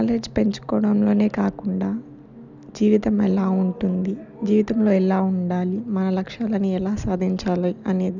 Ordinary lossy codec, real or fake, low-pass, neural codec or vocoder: none; real; 7.2 kHz; none